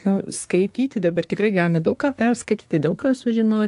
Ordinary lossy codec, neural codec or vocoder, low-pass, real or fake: AAC, 64 kbps; codec, 24 kHz, 1 kbps, SNAC; 10.8 kHz; fake